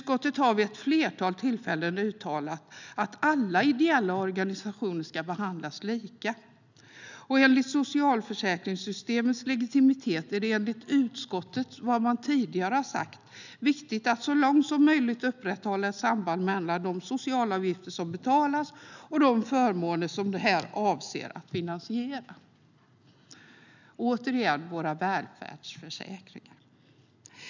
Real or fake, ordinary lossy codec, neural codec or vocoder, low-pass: real; none; none; 7.2 kHz